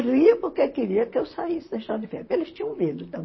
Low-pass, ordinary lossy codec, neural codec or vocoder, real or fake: 7.2 kHz; MP3, 24 kbps; vocoder, 44.1 kHz, 128 mel bands, Pupu-Vocoder; fake